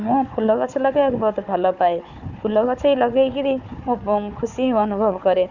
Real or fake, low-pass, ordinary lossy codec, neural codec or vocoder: fake; 7.2 kHz; none; codec, 16 kHz, 4 kbps, FunCodec, trained on Chinese and English, 50 frames a second